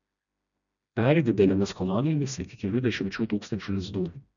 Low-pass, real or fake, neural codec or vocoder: 7.2 kHz; fake; codec, 16 kHz, 1 kbps, FreqCodec, smaller model